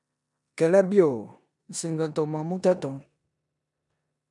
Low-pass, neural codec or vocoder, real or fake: 10.8 kHz; codec, 16 kHz in and 24 kHz out, 0.9 kbps, LongCat-Audio-Codec, four codebook decoder; fake